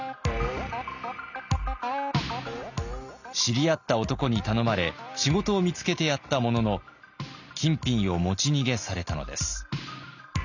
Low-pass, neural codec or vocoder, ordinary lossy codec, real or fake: 7.2 kHz; none; none; real